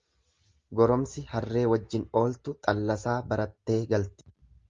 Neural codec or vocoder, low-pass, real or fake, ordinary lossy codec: none; 7.2 kHz; real; Opus, 32 kbps